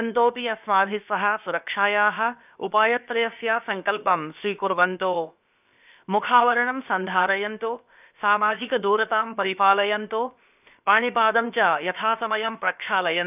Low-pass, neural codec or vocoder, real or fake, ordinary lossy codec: 3.6 kHz; codec, 16 kHz, about 1 kbps, DyCAST, with the encoder's durations; fake; none